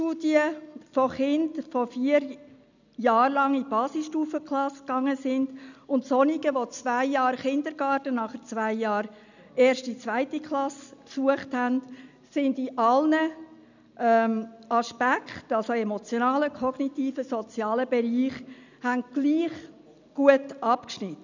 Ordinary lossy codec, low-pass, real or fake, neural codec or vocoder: none; 7.2 kHz; real; none